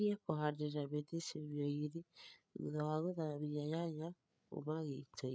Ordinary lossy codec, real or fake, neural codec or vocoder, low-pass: none; fake; codec, 16 kHz, 8 kbps, FreqCodec, larger model; none